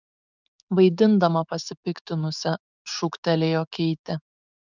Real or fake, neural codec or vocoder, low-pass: fake; codec, 16 kHz, 6 kbps, DAC; 7.2 kHz